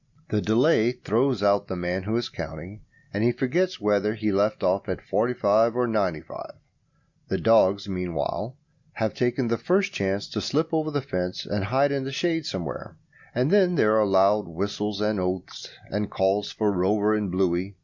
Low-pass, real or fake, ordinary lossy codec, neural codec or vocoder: 7.2 kHz; real; Opus, 64 kbps; none